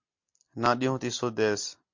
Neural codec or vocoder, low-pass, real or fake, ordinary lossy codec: none; 7.2 kHz; real; MP3, 48 kbps